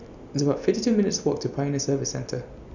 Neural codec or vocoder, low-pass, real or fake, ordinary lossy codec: none; 7.2 kHz; real; none